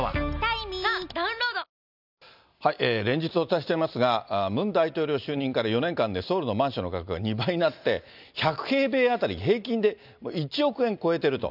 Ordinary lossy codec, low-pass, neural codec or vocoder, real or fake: none; 5.4 kHz; none; real